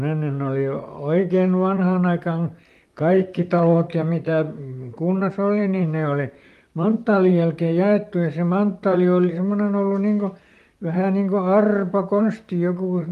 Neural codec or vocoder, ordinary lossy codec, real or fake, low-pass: vocoder, 44.1 kHz, 128 mel bands, Pupu-Vocoder; Opus, 32 kbps; fake; 14.4 kHz